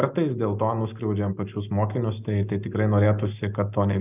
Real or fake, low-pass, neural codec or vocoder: real; 3.6 kHz; none